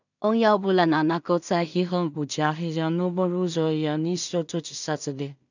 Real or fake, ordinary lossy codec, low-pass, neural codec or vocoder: fake; none; 7.2 kHz; codec, 16 kHz in and 24 kHz out, 0.4 kbps, LongCat-Audio-Codec, two codebook decoder